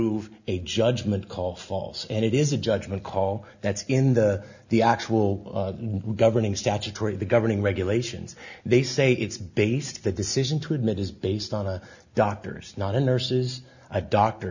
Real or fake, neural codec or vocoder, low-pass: real; none; 7.2 kHz